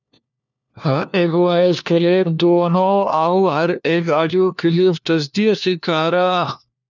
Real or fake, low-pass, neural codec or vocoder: fake; 7.2 kHz; codec, 16 kHz, 1 kbps, FunCodec, trained on LibriTTS, 50 frames a second